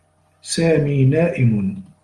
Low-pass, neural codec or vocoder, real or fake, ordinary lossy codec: 10.8 kHz; none; real; Opus, 24 kbps